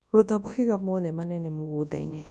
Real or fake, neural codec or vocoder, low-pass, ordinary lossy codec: fake; codec, 24 kHz, 0.9 kbps, WavTokenizer, large speech release; none; none